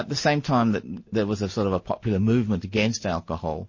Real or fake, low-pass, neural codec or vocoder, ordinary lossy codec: real; 7.2 kHz; none; MP3, 32 kbps